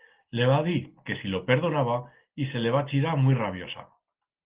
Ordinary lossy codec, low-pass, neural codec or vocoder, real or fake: Opus, 16 kbps; 3.6 kHz; none; real